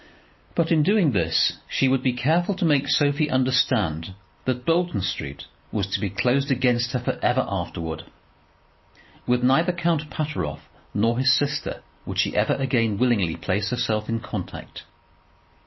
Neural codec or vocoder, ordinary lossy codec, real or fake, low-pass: none; MP3, 24 kbps; real; 7.2 kHz